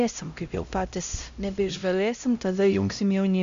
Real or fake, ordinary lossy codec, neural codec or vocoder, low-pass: fake; MP3, 64 kbps; codec, 16 kHz, 0.5 kbps, X-Codec, HuBERT features, trained on LibriSpeech; 7.2 kHz